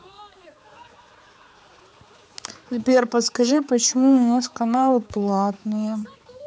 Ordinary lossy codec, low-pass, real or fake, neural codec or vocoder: none; none; fake; codec, 16 kHz, 4 kbps, X-Codec, HuBERT features, trained on balanced general audio